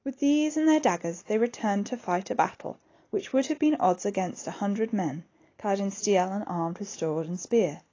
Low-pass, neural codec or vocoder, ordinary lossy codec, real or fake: 7.2 kHz; none; AAC, 32 kbps; real